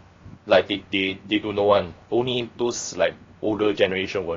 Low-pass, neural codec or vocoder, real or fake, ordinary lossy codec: 7.2 kHz; codec, 16 kHz, 0.7 kbps, FocalCodec; fake; AAC, 32 kbps